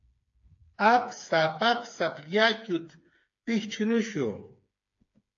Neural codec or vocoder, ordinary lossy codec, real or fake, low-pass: codec, 16 kHz, 4 kbps, FreqCodec, smaller model; AAC, 48 kbps; fake; 7.2 kHz